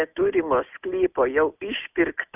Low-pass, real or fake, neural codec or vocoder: 3.6 kHz; real; none